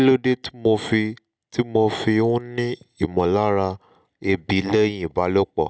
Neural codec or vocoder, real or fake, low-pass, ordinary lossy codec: none; real; none; none